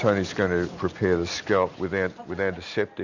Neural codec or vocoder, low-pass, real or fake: none; 7.2 kHz; real